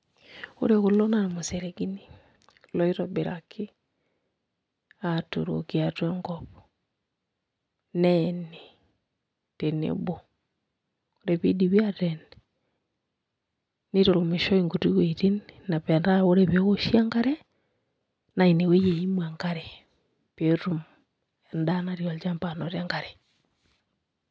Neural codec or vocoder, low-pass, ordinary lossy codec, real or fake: none; none; none; real